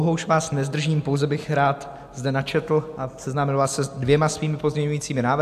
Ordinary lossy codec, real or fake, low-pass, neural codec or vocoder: AAC, 96 kbps; real; 14.4 kHz; none